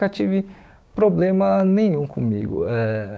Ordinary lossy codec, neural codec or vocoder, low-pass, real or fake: none; codec, 16 kHz, 6 kbps, DAC; none; fake